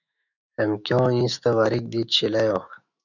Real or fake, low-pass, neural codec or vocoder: fake; 7.2 kHz; autoencoder, 48 kHz, 128 numbers a frame, DAC-VAE, trained on Japanese speech